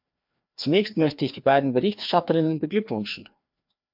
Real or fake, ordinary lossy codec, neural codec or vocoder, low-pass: fake; MP3, 48 kbps; codec, 16 kHz, 2 kbps, FreqCodec, larger model; 5.4 kHz